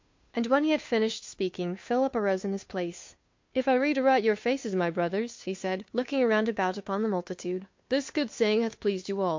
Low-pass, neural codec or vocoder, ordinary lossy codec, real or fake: 7.2 kHz; codec, 16 kHz, 2 kbps, FunCodec, trained on Chinese and English, 25 frames a second; MP3, 48 kbps; fake